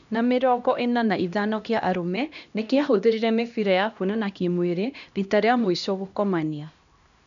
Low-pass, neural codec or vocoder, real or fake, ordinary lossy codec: 7.2 kHz; codec, 16 kHz, 1 kbps, X-Codec, HuBERT features, trained on LibriSpeech; fake; none